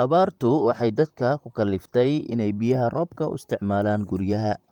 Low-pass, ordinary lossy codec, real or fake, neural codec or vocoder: 19.8 kHz; Opus, 32 kbps; fake; vocoder, 44.1 kHz, 128 mel bands, Pupu-Vocoder